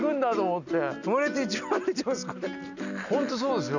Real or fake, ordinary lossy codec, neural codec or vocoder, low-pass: real; none; none; 7.2 kHz